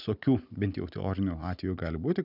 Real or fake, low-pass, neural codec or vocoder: real; 5.4 kHz; none